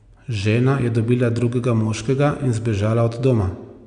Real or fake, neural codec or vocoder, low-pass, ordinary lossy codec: real; none; 9.9 kHz; none